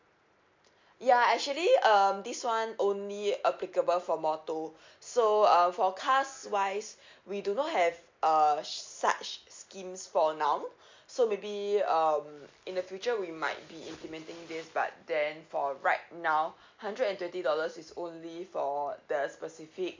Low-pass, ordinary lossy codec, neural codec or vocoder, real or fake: 7.2 kHz; MP3, 48 kbps; none; real